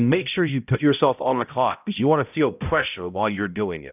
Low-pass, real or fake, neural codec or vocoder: 3.6 kHz; fake; codec, 16 kHz, 0.5 kbps, X-Codec, HuBERT features, trained on balanced general audio